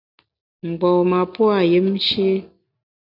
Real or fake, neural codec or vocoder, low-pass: real; none; 5.4 kHz